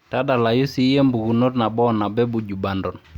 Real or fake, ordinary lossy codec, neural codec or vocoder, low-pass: real; Opus, 64 kbps; none; 19.8 kHz